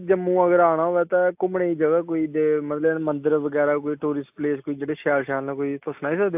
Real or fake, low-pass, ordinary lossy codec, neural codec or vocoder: real; 3.6 kHz; AAC, 32 kbps; none